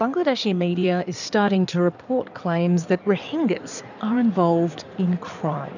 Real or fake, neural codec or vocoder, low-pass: fake; codec, 16 kHz in and 24 kHz out, 2.2 kbps, FireRedTTS-2 codec; 7.2 kHz